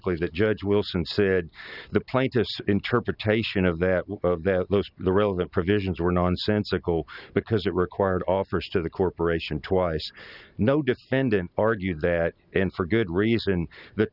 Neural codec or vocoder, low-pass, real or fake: none; 5.4 kHz; real